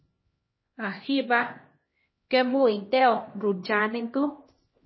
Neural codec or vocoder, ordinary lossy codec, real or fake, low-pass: codec, 16 kHz, 1 kbps, X-Codec, HuBERT features, trained on LibriSpeech; MP3, 24 kbps; fake; 7.2 kHz